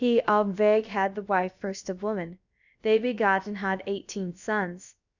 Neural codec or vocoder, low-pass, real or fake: codec, 16 kHz, about 1 kbps, DyCAST, with the encoder's durations; 7.2 kHz; fake